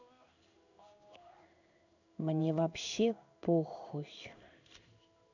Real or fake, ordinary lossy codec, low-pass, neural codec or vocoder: fake; none; 7.2 kHz; codec, 16 kHz in and 24 kHz out, 1 kbps, XY-Tokenizer